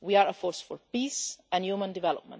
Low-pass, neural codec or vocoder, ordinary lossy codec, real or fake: none; none; none; real